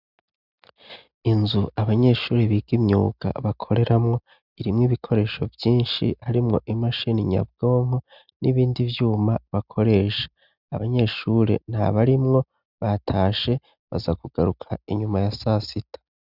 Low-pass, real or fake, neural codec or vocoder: 5.4 kHz; real; none